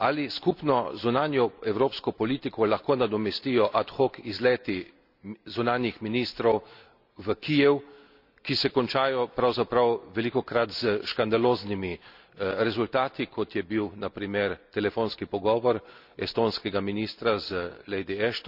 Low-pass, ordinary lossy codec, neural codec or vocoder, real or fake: 5.4 kHz; none; none; real